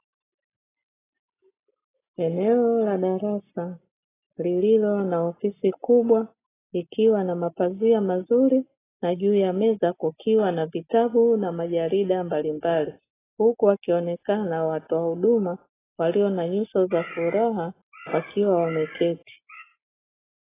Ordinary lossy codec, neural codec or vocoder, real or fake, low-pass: AAC, 16 kbps; none; real; 3.6 kHz